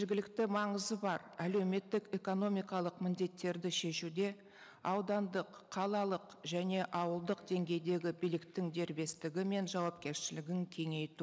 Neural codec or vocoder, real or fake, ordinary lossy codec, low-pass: none; real; none; none